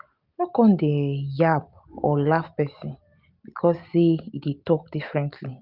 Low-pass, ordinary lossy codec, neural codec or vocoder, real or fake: 5.4 kHz; Opus, 64 kbps; none; real